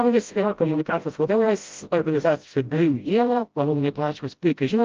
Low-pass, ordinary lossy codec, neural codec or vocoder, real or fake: 7.2 kHz; Opus, 24 kbps; codec, 16 kHz, 0.5 kbps, FreqCodec, smaller model; fake